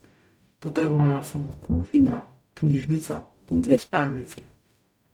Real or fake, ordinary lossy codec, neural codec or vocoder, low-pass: fake; none; codec, 44.1 kHz, 0.9 kbps, DAC; 19.8 kHz